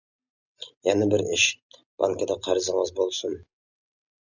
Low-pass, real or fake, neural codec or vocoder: 7.2 kHz; real; none